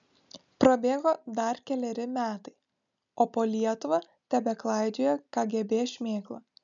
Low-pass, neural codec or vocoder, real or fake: 7.2 kHz; none; real